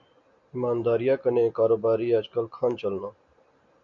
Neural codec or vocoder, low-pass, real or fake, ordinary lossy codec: none; 7.2 kHz; real; MP3, 64 kbps